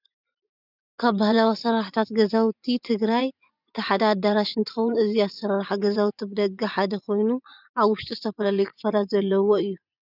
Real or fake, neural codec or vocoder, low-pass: fake; vocoder, 24 kHz, 100 mel bands, Vocos; 5.4 kHz